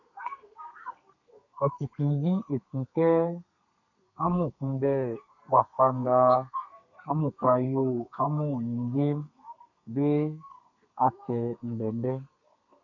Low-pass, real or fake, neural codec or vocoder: 7.2 kHz; fake; codec, 32 kHz, 1.9 kbps, SNAC